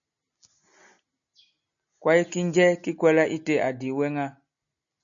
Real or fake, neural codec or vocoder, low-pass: real; none; 7.2 kHz